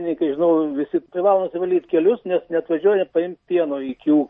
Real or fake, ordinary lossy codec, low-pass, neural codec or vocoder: real; MP3, 32 kbps; 10.8 kHz; none